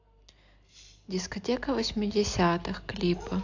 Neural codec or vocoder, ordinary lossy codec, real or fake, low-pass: none; AAC, 48 kbps; real; 7.2 kHz